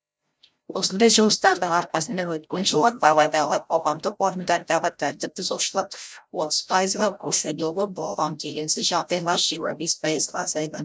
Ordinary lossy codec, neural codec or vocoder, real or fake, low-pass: none; codec, 16 kHz, 0.5 kbps, FreqCodec, larger model; fake; none